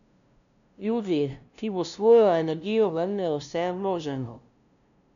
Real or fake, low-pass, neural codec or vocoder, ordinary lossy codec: fake; 7.2 kHz; codec, 16 kHz, 0.5 kbps, FunCodec, trained on LibriTTS, 25 frames a second; none